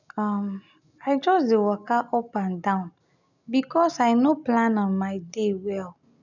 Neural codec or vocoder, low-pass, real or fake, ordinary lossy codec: none; 7.2 kHz; real; none